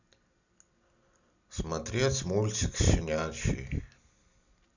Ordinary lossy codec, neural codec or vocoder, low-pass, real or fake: none; none; 7.2 kHz; real